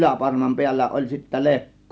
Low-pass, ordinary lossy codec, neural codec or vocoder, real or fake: none; none; none; real